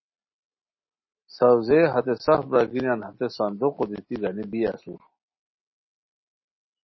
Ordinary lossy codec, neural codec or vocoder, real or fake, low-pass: MP3, 24 kbps; none; real; 7.2 kHz